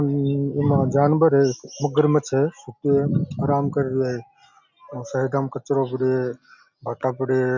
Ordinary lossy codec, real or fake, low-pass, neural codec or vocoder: none; real; 7.2 kHz; none